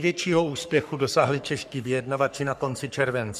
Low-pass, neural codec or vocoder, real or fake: 14.4 kHz; codec, 44.1 kHz, 3.4 kbps, Pupu-Codec; fake